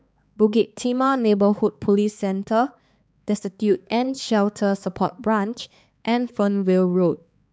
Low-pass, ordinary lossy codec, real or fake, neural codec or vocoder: none; none; fake; codec, 16 kHz, 4 kbps, X-Codec, HuBERT features, trained on balanced general audio